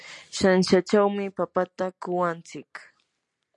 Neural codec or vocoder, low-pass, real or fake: none; 10.8 kHz; real